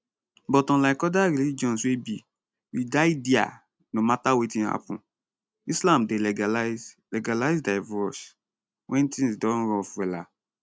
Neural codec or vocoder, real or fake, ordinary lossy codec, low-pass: none; real; none; none